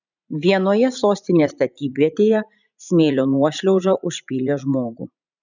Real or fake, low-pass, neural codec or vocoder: fake; 7.2 kHz; vocoder, 44.1 kHz, 80 mel bands, Vocos